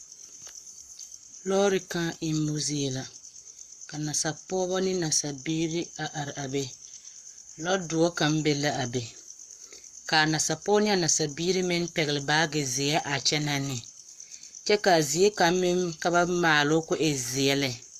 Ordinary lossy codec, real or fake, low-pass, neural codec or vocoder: Opus, 64 kbps; fake; 14.4 kHz; codec, 44.1 kHz, 7.8 kbps, Pupu-Codec